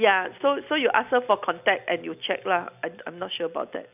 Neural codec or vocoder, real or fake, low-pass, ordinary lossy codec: none; real; 3.6 kHz; none